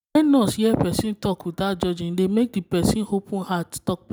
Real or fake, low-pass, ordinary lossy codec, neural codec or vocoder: real; none; none; none